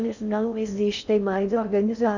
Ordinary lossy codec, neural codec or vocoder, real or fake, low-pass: none; codec, 16 kHz in and 24 kHz out, 0.6 kbps, FocalCodec, streaming, 4096 codes; fake; 7.2 kHz